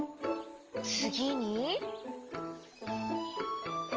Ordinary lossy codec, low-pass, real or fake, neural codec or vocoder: Opus, 24 kbps; 7.2 kHz; real; none